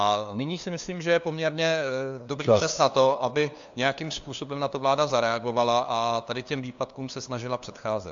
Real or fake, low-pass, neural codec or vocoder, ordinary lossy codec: fake; 7.2 kHz; codec, 16 kHz, 2 kbps, FunCodec, trained on LibriTTS, 25 frames a second; AAC, 64 kbps